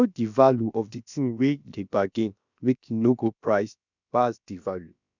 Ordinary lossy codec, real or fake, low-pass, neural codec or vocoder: none; fake; 7.2 kHz; codec, 16 kHz, about 1 kbps, DyCAST, with the encoder's durations